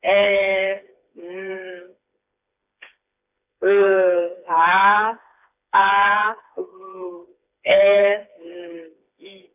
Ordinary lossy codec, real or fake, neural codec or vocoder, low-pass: none; fake; codec, 16 kHz, 4 kbps, FreqCodec, smaller model; 3.6 kHz